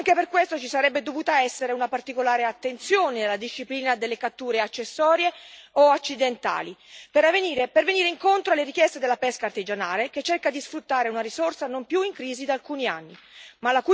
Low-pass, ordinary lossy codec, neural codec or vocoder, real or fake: none; none; none; real